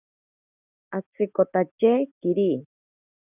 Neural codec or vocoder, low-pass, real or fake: none; 3.6 kHz; real